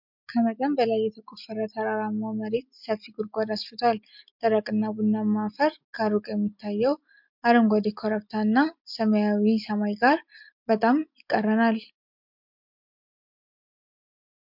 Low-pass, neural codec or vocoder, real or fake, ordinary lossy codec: 5.4 kHz; none; real; MP3, 48 kbps